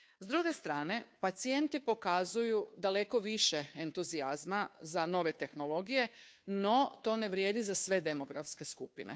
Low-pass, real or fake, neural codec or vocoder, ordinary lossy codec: none; fake; codec, 16 kHz, 2 kbps, FunCodec, trained on Chinese and English, 25 frames a second; none